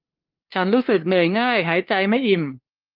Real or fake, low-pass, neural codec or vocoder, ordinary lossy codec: fake; 5.4 kHz; codec, 16 kHz, 2 kbps, FunCodec, trained on LibriTTS, 25 frames a second; Opus, 32 kbps